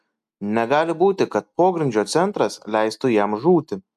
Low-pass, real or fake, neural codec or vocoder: 14.4 kHz; real; none